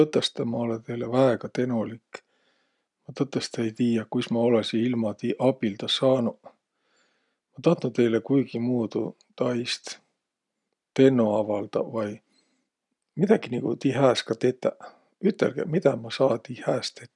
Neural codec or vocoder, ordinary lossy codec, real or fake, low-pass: none; none; real; 10.8 kHz